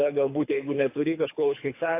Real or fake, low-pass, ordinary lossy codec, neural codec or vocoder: fake; 3.6 kHz; AAC, 24 kbps; codec, 24 kHz, 3 kbps, HILCodec